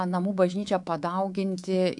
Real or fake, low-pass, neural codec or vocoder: fake; 10.8 kHz; codec, 24 kHz, 3.1 kbps, DualCodec